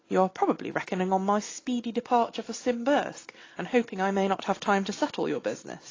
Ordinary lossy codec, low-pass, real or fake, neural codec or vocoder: AAC, 32 kbps; 7.2 kHz; real; none